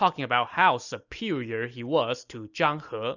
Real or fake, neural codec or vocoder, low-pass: real; none; 7.2 kHz